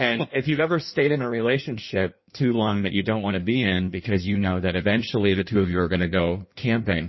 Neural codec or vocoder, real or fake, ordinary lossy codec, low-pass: codec, 16 kHz in and 24 kHz out, 1.1 kbps, FireRedTTS-2 codec; fake; MP3, 24 kbps; 7.2 kHz